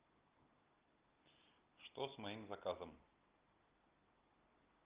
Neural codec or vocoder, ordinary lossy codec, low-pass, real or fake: none; Opus, 24 kbps; 3.6 kHz; real